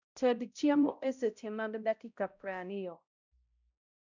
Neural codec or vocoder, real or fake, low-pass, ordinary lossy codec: codec, 16 kHz, 0.5 kbps, X-Codec, HuBERT features, trained on balanced general audio; fake; 7.2 kHz; none